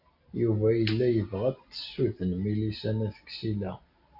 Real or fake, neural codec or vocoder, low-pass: real; none; 5.4 kHz